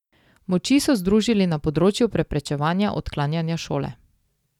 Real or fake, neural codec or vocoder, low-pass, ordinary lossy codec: real; none; 19.8 kHz; none